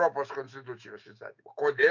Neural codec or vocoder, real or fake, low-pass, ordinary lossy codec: autoencoder, 48 kHz, 128 numbers a frame, DAC-VAE, trained on Japanese speech; fake; 7.2 kHz; MP3, 64 kbps